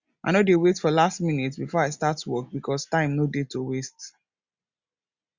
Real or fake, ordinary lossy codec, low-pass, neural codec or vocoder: real; Opus, 64 kbps; 7.2 kHz; none